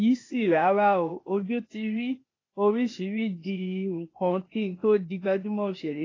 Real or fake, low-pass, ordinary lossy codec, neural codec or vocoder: fake; 7.2 kHz; AAC, 32 kbps; codec, 16 kHz, 0.8 kbps, ZipCodec